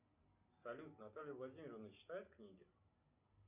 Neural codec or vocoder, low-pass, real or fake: none; 3.6 kHz; real